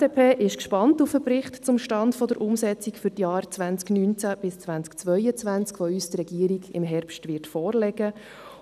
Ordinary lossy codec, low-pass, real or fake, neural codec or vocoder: none; 14.4 kHz; real; none